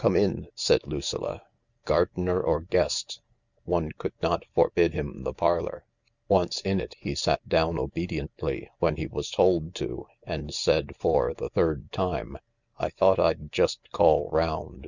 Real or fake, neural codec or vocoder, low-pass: real; none; 7.2 kHz